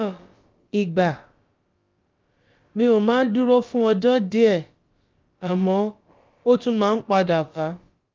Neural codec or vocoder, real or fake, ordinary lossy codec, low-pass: codec, 16 kHz, about 1 kbps, DyCAST, with the encoder's durations; fake; Opus, 32 kbps; 7.2 kHz